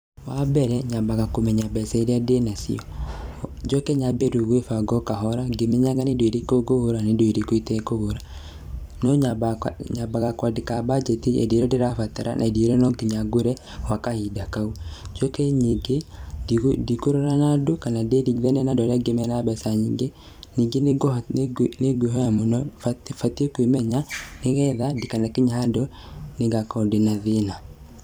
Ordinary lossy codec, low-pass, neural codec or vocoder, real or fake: none; none; vocoder, 44.1 kHz, 128 mel bands every 256 samples, BigVGAN v2; fake